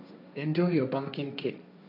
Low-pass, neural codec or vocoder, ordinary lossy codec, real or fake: 5.4 kHz; codec, 16 kHz, 1.1 kbps, Voila-Tokenizer; none; fake